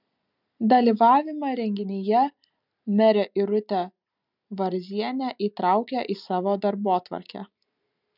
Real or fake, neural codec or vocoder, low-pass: real; none; 5.4 kHz